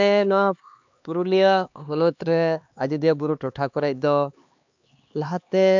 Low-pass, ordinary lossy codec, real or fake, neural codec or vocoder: 7.2 kHz; MP3, 64 kbps; fake; codec, 16 kHz, 2 kbps, X-Codec, HuBERT features, trained on LibriSpeech